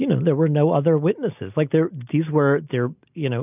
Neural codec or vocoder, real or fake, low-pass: none; real; 3.6 kHz